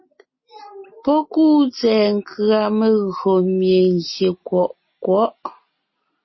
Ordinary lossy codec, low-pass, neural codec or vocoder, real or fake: MP3, 24 kbps; 7.2 kHz; none; real